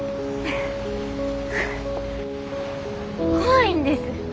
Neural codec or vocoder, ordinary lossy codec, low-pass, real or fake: none; none; none; real